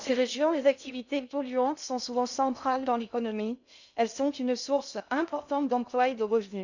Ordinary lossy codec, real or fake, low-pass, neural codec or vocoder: none; fake; 7.2 kHz; codec, 16 kHz in and 24 kHz out, 0.6 kbps, FocalCodec, streaming, 2048 codes